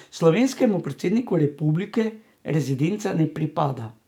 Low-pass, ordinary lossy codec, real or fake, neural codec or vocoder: 19.8 kHz; none; fake; codec, 44.1 kHz, 7.8 kbps, DAC